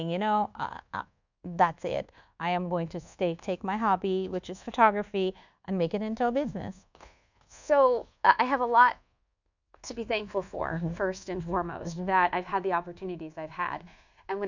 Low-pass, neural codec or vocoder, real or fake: 7.2 kHz; codec, 24 kHz, 1.2 kbps, DualCodec; fake